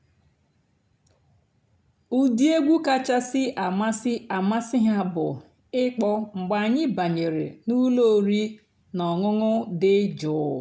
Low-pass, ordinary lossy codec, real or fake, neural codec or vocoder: none; none; real; none